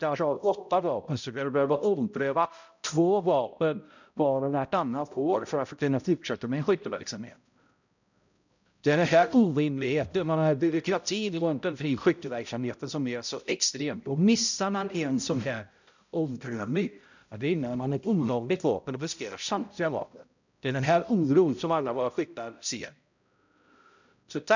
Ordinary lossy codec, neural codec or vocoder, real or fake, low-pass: none; codec, 16 kHz, 0.5 kbps, X-Codec, HuBERT features, trained on balanced general audio; fake; 7.2 kHz